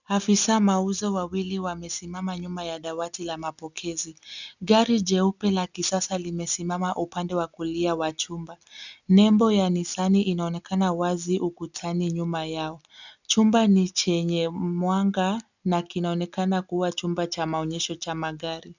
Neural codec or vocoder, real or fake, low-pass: none; real; 7.2 kHz